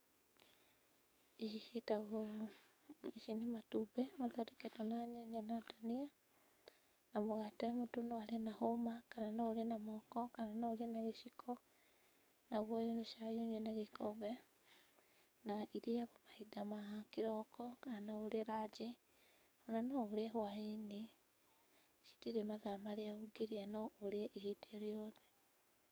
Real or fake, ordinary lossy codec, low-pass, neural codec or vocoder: fake; none; none; codec, 44.1 kHz, 7.8 kbps, DAC